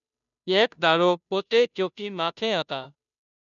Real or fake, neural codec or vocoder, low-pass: fake; codec, 16 kHz, 0.5 kbps, FunCodec, trained on Chinese and English, 25 frames a second; 7.2 kHz